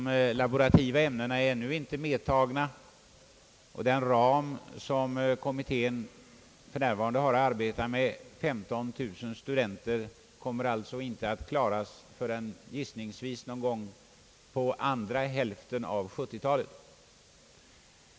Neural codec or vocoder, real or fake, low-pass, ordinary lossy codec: none; real; none; none